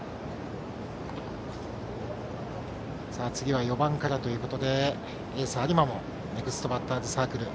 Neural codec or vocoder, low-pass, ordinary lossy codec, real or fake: none; none; none; real